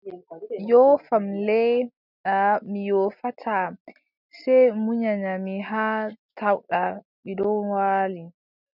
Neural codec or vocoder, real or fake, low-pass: none; real; 5.4 kHz